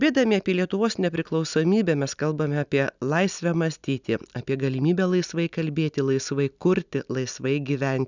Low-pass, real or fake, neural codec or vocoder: 7.2 kHz; real; none